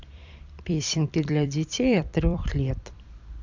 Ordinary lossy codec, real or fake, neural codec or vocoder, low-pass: MP3, 64 kbps; fake; vocoder, 44.1 kHz, 128 mel bands every 256 samples, BigVGAN v2; 7.2 kHz